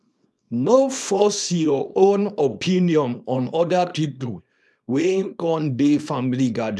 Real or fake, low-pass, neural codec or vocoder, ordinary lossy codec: fake; none; codec, 24 kHz, 0.9 kbps, WavTokenizer, small release; none